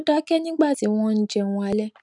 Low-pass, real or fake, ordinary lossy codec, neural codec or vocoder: 10.8 kHz; real; none; none